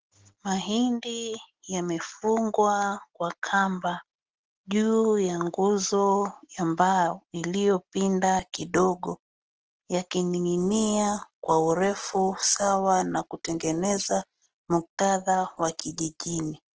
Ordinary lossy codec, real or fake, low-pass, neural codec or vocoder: Opus, 16 kbps; real; 7.2 kHz; none